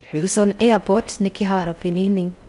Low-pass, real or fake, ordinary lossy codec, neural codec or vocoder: 10.8 kHz; fake; none; codec, 16 kHz in and 24 kHz out, 0.6 kbps, FocalCodec, streaming, 4096 codes